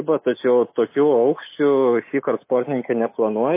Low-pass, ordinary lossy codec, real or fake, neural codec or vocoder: 3.6 kHz; MP3, 24 kbps; real; none